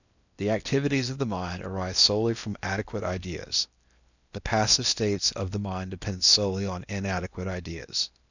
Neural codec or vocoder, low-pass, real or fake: codec, 16 kHz in and 24 kHz out, 0.8 kbps, FocalCodec, streaming, 65536 codes; 7.2 kHz; fake